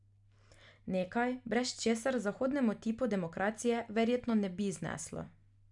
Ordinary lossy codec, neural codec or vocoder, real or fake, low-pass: none; none; real; 10.8 kHz